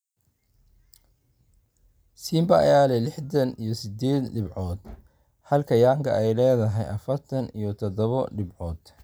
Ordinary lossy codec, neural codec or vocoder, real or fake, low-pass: none; none; real; none